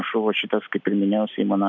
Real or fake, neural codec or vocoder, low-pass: real; none; 7.2 kHz